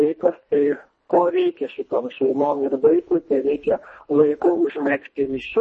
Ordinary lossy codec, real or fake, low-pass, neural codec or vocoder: MP3, 32 kbps; fake; 10.8 kHz; codec, 24 kHz, 1.5 kbps, HILCodec